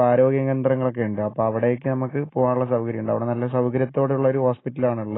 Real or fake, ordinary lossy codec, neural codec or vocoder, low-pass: real; AAC, 16 kbps; none; 7.2 kHz